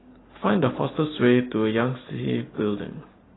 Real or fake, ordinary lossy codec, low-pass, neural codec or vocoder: real; AAC, 16 kbps; 7.2 kHz; none